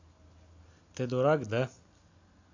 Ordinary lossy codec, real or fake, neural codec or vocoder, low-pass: AAC, 48 kbps; real; none; 7.2 kHz